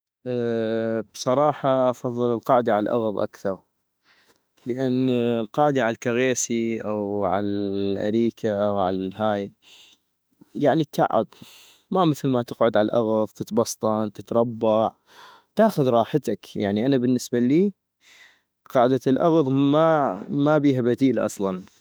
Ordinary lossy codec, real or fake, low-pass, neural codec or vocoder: none; fake; none; autoencoder, 48 kHz, 32 numbers a frame, DAC-VAE, trained on Japanese speech